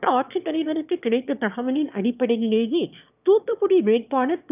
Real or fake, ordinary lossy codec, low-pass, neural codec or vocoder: fake; none; 3.6 kHz; autoencoder, 22.05 kHz, a latent of 192 numbers a frame, VITS, trained on one speaker